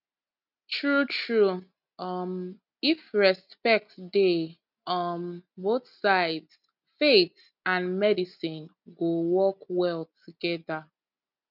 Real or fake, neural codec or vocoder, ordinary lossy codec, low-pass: real; none; none; 5.4 kHz